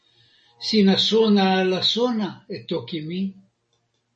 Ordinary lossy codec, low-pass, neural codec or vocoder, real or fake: MP3, 32 kbps; 10.8 kHz; none; real